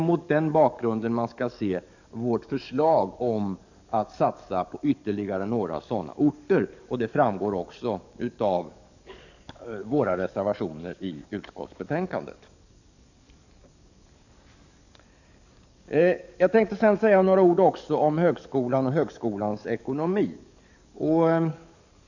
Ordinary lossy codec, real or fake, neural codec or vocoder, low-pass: none; fake; codec, 44.1 kHz, 7.8 kbps, DAC; 7.2 kHz